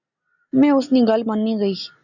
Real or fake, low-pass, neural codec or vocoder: real; 7.2 kHz; none